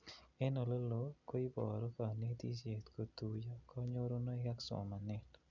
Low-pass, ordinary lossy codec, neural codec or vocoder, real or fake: 7.2 kHz; none; none; real